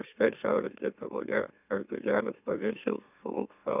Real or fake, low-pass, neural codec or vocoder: fake; 3.6 kHz; autoencoder, 44.1 kHz, a latent of 192 numbers a frame, MeloTTS